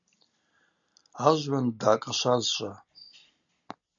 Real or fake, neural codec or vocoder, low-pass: real; none; 7.2 kHz